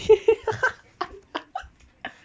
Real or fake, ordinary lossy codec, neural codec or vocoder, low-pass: real; none; none; none